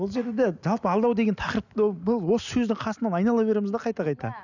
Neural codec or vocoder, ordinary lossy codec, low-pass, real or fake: none; none; 7.2 kHz; real